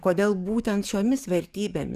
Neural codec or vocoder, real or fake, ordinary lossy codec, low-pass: codec, 44.1 kHz, 7.8 kbps, DAC; fake; Opus, 64 kbps; 14.4 kHz